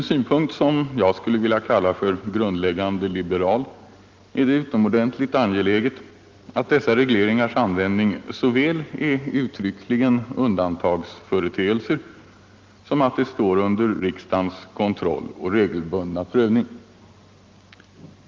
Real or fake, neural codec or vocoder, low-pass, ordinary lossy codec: real; none; 7.2 kHz; Opus, 32 kbps